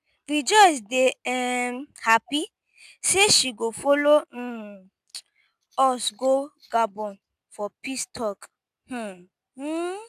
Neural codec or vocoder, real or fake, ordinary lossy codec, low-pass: none; real; none; 14.4 kHz